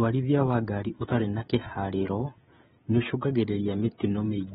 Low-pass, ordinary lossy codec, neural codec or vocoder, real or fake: 7.2 kHz; AAC, 16 kbps; codec, 16 kHz, 16 kbps, FreqCodec, smaller model; fake